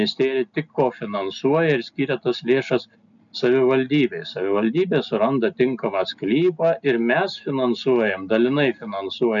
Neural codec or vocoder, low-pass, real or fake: none; 7.2 kHz; real